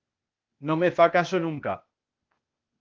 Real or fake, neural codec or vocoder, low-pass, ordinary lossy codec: fake; codec, 16 kHz, 0.8 kbps, ZipCodec; 7.2 kHz; Opus, 24 kbps